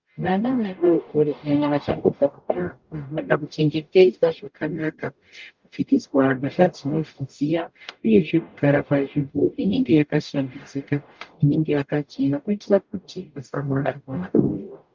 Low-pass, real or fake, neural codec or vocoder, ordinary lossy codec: 7.2 kHz; fake; codec, 44.1 kHz, 0.9 kbps, DAC; Opus, 24 kbps